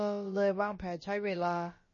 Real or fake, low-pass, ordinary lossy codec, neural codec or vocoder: fake; 7.2 kHz; MP3, 32 kbps; codec, 16 kHz, about 1 kbps, DyCAST, with the encoder's durations